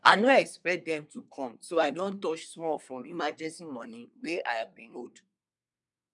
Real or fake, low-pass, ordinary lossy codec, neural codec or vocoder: fake; 10.8 kHz; none; codec, 24 kHz, 1 kbps, SNAC